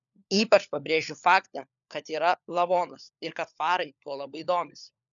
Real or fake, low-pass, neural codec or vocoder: fake; 7.2 kHz; codec, 16 kHz, 4 kbps, FunCodec, trained on LibriTTS, 50 frames a second